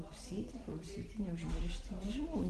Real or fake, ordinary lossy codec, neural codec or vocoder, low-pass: real; Opus, 16 kbps; none; 10.8 kHz